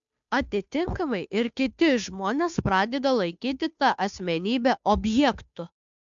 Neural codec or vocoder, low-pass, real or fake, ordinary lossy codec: codec, 16 kHz, 2 kbps, FunCodec, trained on Chinese and English, 25 frames a second; 7.2 kHz; fake; MP3, 64 kbps